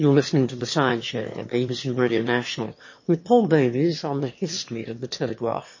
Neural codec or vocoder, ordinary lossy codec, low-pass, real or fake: autoencoder, 22.05 kHz, a latent of 192 numbers a frame, VITS, trained on one speaker; MP3, 32 kbps; 7.2 kHz; fake